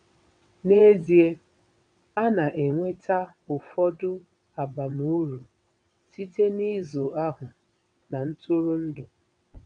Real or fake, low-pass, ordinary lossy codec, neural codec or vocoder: fake; 9.9 kHz; none; vocoder, 22.05 kHz, 80 mel bands, WaveNeXt